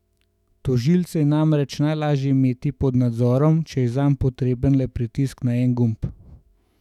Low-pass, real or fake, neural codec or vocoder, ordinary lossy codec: 19.8 kHz; fake; autoencoder, 48 kHz, 128 numbers a frame, DAC-VAE, trained on Japanese speech; none